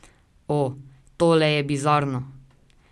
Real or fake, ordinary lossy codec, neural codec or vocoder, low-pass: real; none; none; none